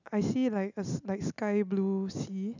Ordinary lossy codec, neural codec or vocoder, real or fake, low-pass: none; none; real; 7.2 kHz